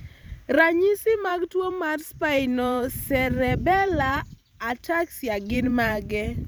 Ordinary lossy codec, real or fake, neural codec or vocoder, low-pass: none; fake; vocoder, 44.1 kHz, 128 mel bands every 512 samples, BigVGAN v2; none